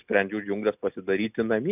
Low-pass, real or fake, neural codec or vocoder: 3.6 kHz; real; none